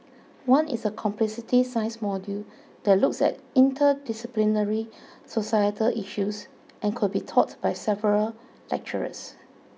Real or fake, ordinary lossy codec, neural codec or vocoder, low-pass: real; none; none; none